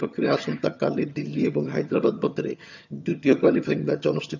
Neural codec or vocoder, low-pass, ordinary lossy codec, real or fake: vocoder, 22.05 kHz, 80 mel bands, HiFi-GAN; 7.2 kHz; none; fake